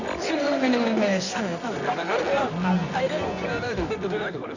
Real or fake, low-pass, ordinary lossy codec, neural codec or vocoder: fake; 7.2 kHz; none; codec, 24 kHz, 0.9 kbps, WavTokenizer, medium music audio release